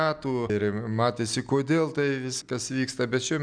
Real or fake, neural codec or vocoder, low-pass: real; none; 9.9 kHz